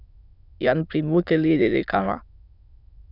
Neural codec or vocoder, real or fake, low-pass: autoencoder, 22.05 kHz, a latent of 192 numbers a frame, VITS, trained on many speakers; fake; 5.4 kHz